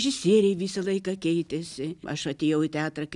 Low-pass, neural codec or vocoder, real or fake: 10.8 kHz; none; real